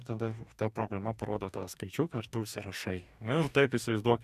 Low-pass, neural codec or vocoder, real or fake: 14.4 kHz; codec, 44.1 kHz, 2.6 kbps, DAC; fake